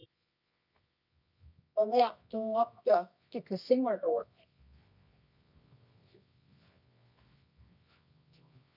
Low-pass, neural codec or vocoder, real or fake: 5.4 kHz; codec, 24 kHz, 0.9 kbps, WavTokenizer, medium music audio release; fake